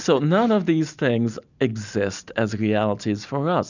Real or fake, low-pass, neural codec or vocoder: real; 7.2 kHz; none